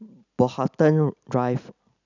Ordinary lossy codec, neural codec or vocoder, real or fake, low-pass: none; none; real; 7.2 kHz